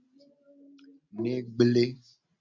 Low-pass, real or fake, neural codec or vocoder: 7.2 kHz; real; none